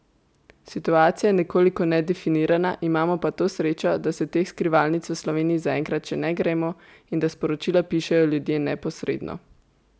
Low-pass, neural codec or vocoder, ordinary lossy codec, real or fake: none; none; none; real